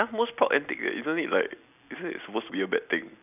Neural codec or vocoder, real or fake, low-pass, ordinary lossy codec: none; real; 3.6 kHz; none